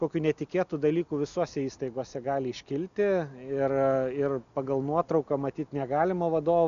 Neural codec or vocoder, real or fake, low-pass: none; real; 7.2 kHz